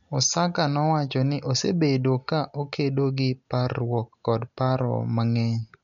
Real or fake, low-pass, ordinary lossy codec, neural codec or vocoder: real; 7.2 kHz; none; none